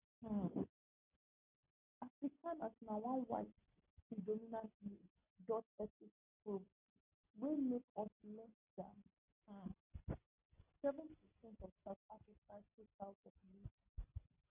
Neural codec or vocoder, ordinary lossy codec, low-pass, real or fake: none; none; 3.6 kHz; real